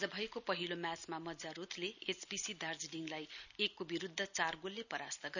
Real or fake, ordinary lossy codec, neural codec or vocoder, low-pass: real; none; none; 7.2 kHz